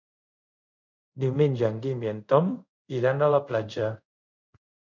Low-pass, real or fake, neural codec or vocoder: 7.2 kHz; fake; codec, 24 kHz, 0.5 kbps, DualCodec